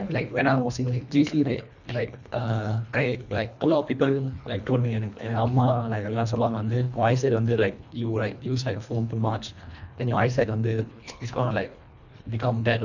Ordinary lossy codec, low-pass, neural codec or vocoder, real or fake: none; 7.2 kHz; codec, 24 kHz, 1.5 kbps, HILCodec; fake